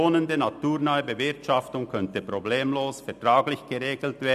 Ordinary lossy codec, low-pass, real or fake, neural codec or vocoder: none; 14.4 kHz; real; none